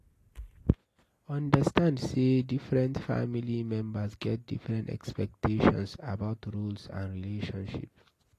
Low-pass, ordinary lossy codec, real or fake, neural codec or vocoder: 14.4 kHz; AAC, 48 kbps; real; none